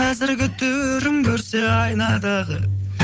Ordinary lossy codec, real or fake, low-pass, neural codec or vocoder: none; fake; none; codec, 16 kHz, 8 kbps, FunCodec, trained on Chinese and English, 25 frames a second